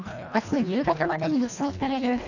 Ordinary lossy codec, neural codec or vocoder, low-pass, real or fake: none; codec, 24 kHz, 1.5 kbps, HILCodec; 7.2 kHz; fake